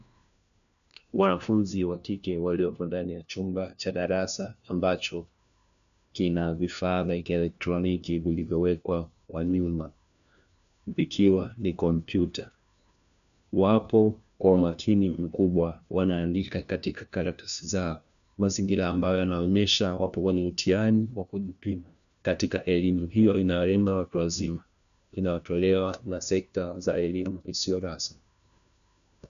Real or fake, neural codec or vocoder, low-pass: fake; codec, 16 kHz, 1 kbps, FunCodec, trained on LibriTTS, 50 frames a second; 7.2 kHz